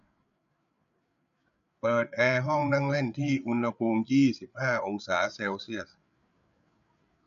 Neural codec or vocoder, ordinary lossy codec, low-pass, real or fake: codec, 16 kHz, 8 kbps, FreqCodec, larger model; none; 7.2 kHz; fake